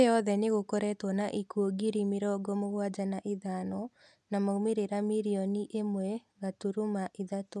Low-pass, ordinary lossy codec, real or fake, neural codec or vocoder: none; none; real; none